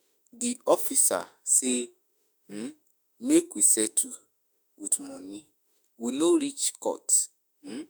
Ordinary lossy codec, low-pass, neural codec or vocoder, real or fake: none; none; autoencoder, 48 kHz, 32 numbers a frame, DAC-VAE, trained on Japanese speech; fake